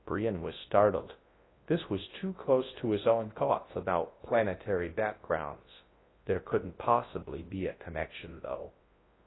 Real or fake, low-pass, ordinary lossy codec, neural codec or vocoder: fake; 7.2 kHz; AAC, 16 kbps; codec, 24 kHz, 0.9 kbps, WavTokenizer, large speech release